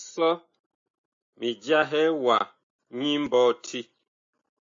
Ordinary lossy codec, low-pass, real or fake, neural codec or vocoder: AAC, 64 kbps; 7.2 kHz; real; none